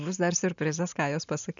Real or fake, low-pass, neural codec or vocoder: real; 7.2 kHz; none